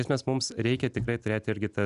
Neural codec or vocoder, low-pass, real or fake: none; 10.8 kHz; real